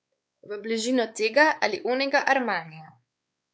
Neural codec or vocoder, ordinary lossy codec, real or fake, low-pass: codec, 16 kHz, 4 kbps, X-Codec, WavLM features, trained on Multilingual LibriSpeech; none; fake; none